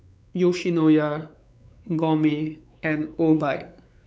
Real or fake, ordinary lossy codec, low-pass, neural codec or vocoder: fake; none; none; codec, 16 kHz, 4 kbps, X-Codec, WavLM features, trained on Multilingual LibriSpeech